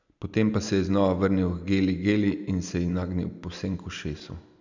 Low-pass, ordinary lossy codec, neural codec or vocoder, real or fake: 7.2 kHz; none; none; real